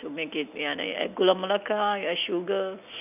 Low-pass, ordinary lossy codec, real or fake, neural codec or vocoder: 3.6 kHz; none; real; none